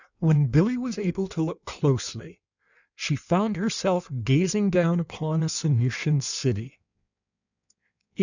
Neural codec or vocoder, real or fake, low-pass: codec, 16 kHz in and 24 kHz out, 1.1 kbps, FireRedTTS-2 codec; fake; 7.2 kHz